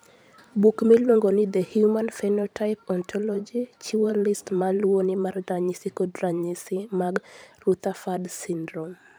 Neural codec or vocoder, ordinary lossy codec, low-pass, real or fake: vocoder, 44.1 kHz, 128 mel bands every 512 samples, BigVGAN v2; none; none; fake